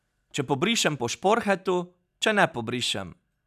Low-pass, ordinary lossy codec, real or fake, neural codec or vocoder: 14.4 kHz; none; real; none